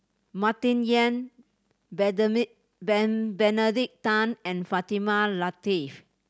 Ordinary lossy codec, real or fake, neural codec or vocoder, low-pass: none; real; none; none